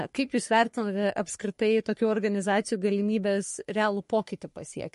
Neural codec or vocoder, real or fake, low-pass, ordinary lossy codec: codec, 44.1 kHz, 3.4 kbps, Pupu-Codec; fake; 14.4 kHz; MP3, 48 kbps